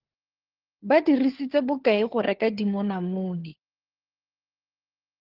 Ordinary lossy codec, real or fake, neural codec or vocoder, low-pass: Opus, 16 kbps; fake; codec, 16 kHz, 4 kbps, FunCodec, trained on LibriTTS, 50 frames a second; 5.4 kHz